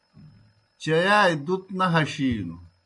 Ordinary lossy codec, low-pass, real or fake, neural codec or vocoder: MP3, 64 kbps; 10.8 kHz; real; none